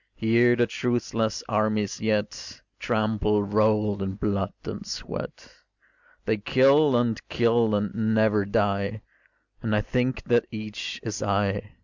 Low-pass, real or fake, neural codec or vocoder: 7.2 kHz; real; none